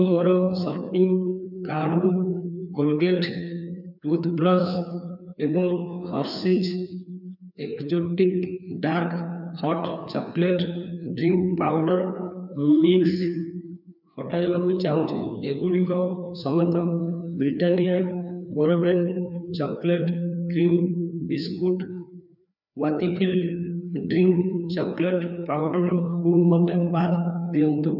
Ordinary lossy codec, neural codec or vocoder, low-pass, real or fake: none; codec, 16 kHz, 2 kbps, FreqCodec, larger model; 5.4 kHz; fake